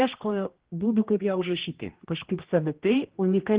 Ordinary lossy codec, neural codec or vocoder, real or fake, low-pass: Opus, 16 kbps; codec, 16 kHz, 1 kbps, X-Codec, HuBERT features, trained on general audio; fake; 3.6 kHz